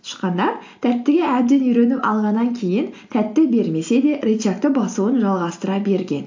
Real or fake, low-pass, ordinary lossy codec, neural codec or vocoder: real; 7.2 kHz; AAC, 48 kbps; none